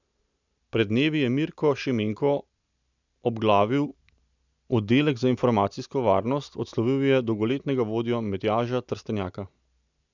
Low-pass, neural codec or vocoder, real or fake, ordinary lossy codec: 7.2 kHz; none; real; none